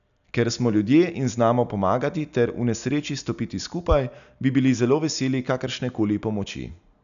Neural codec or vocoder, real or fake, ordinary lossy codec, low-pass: none; real; none; 7.2 kHz